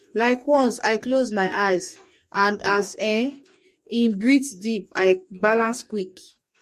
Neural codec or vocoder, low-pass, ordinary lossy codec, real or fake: codec, 44.1 kHz, 2.6 kbps, DAC; 14.4 kHz; MP3, 64 kbps; fake